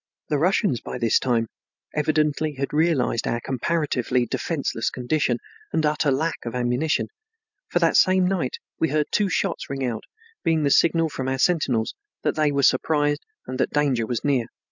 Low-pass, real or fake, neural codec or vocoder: 7.2 kHz; real; none